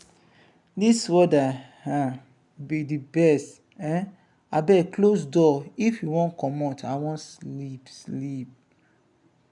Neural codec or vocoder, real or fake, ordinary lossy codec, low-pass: none; real; none; 10.8 kHz